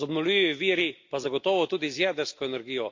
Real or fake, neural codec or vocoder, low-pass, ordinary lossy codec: real; none; 7.2 kHz; MP3, 48 kbps